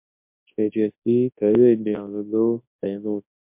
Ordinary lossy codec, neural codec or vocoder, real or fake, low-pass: MP3, 32 kbps; codec, 24 kHz, 0.9 kbps, WavTokenizer, large speech release; fake; 3.6 kHz